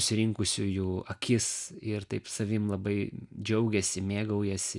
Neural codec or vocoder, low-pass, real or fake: none; 10.8 kHz; real